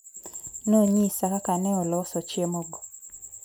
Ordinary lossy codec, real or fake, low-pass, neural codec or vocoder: none; real; none; none